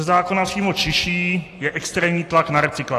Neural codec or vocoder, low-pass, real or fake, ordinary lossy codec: none; 14.4 kHz; real; AAC, 48 kbps